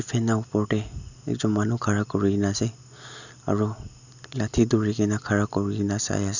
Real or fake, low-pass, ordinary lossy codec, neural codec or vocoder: real; 7.2 kHz; none; none